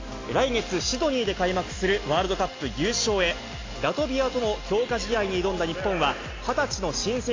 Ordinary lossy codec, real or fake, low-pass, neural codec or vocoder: AAC, 32 kbps; real; 7.2 kHz; none